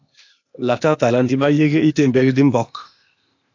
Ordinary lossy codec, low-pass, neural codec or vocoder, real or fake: AAC, 48 kbps; 7.2 kHz; codec, 16 kHz, 0.8 kbps, ZipCodec; fake